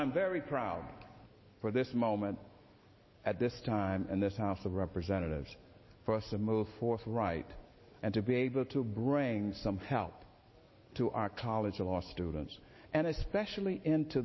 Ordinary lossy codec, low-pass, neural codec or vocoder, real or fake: MP3, 24 kbps; 7.2 kHz; none; real